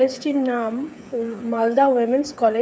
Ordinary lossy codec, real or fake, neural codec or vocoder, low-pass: none; fake; codec, 16 kHz, 8 kbps, FreqCodec, smaller model; none